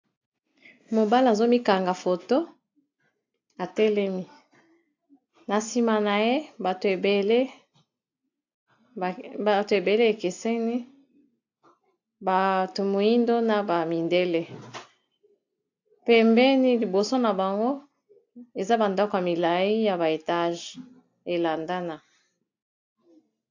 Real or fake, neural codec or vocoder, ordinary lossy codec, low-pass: real; none; AAC, 48 kbps; 7.2 kHz